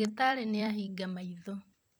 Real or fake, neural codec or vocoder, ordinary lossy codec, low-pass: fake; vocoder, 44.1 kHz, 128 mel bands every 256 samples, BigVGAN v2; none; none